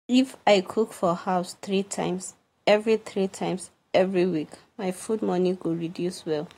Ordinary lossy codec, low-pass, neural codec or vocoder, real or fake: AAC, 48 kbps; 19.8 kHz; none; real